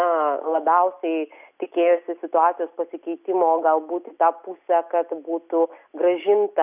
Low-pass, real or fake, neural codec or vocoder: 3.6 kHz; real; none